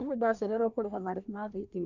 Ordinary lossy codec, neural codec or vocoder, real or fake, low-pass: none; codec, 24 kHz, 1 kbps, SNAC; fake; 7.2 kHz